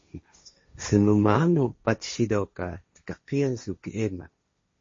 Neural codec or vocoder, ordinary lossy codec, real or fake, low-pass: codec, 16 kHz, 1.1 kbps, Voila-Tokenizer; MP3, 32 kbps; fake; 7.2 kHz